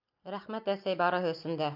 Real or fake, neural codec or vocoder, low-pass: real; none; 5.4 kHz